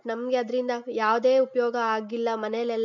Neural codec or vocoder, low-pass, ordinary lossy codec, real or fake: none; 7.2 kHz; none; real